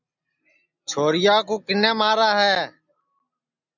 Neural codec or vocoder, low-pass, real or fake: none; 7.2 kHz; real